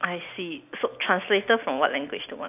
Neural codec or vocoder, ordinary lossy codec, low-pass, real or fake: none; AAC, 32 kbps; 3.6 kHz; real